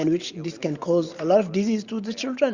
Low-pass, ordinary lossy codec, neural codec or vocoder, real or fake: 7.2 kHz; Opus, 64 kbps; none; real